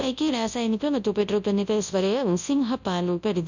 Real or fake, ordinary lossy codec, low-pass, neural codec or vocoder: fake; none; 7.2 kHz; codec, 24 kHz, 0.9 kbps, WavTokenizer, large speech release